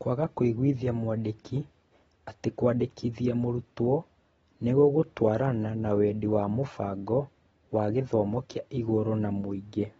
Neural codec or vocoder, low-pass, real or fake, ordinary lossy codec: none; 9.9 kHz; real; AAC, 24 kbps